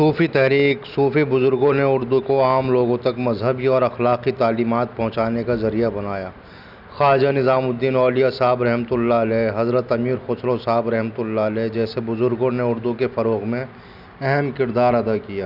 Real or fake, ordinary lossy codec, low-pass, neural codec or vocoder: real; none; 5.4 kHz; none